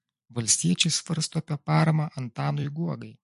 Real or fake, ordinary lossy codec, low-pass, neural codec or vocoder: fake; MP3, 48 kbps; 14.4 kHz; vocoder, 44.1 kHz, 128 mel bands every 256 samples, BigVGAN v2